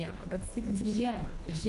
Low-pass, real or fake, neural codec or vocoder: 10.8 kHz; fake; codec, 24 kHz, 1.5 kbps, HILCodec